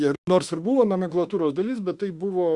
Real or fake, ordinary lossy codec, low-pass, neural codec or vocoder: fake; Opus, 64 kbps; 10.8 kHz; autoencoder, 48 kHz, 32 numbers a frame, DAC-VAE, trained on Japanese speech